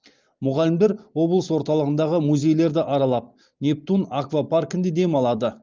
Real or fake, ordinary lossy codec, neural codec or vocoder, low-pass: real; Opus, 32 kbps; none; 7.2 kHz